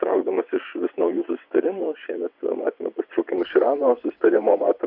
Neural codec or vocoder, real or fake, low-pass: vocoder, 22.05 kHz, 80 mel bands, WaveNeXt; fake; 5.4 kHz